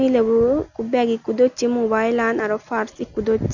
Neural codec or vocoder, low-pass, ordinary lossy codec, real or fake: none; 7.2 kHz; none; real